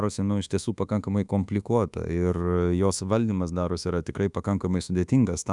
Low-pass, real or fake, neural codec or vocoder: 10.8 kHz; fake; codec, 24 kHz, 1.2 kbps, DualCodec